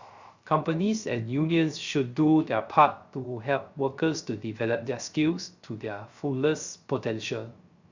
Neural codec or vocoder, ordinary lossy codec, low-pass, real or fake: codec, 16 kHz, 0.3 kbps, FocalCodec; Opus, 64 kbps; 7.2 kHz; fake